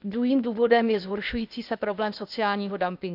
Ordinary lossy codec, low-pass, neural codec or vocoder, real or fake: none; 5.4 kHz; codec, 16 kHz, 0.8 kbps, ZipCodec; fake